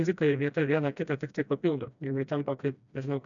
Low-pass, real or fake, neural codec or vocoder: 7.2 kHz; fake; codec, 16 kHz, 1 kbps, FreqCodec, smaller model